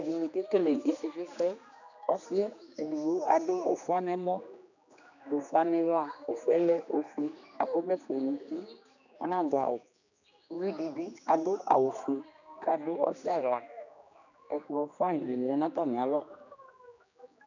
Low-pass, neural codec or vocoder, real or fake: 7.2 kHz; codec, 16 kHz, 2 kbps, X-Codec, HuBERT features, trained on general audio; fake